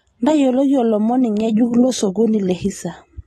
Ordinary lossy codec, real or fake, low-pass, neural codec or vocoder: AAC, 32 kbps; real; 19.8 kHz; none